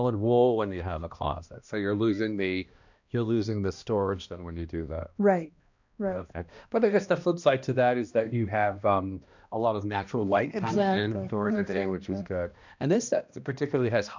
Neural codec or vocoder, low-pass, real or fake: codec, 16 kHz, 1 kbps, X-Codec, HuBERT features, trained on balanced general audio; 7.2 kHz; fake